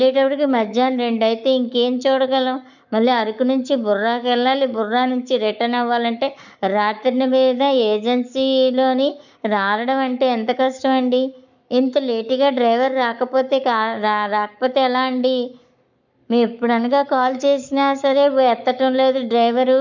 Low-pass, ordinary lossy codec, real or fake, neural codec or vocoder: 7.2 kHz; none; fake; codec, 44.1 kHz, 7.8 kbps, Pupu-Codec